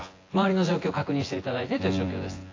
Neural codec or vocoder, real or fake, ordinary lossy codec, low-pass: vocoder, 24 kHz, 100 mel bands, Vocos; fake; AAC, 32 kbps; 7.2 kHz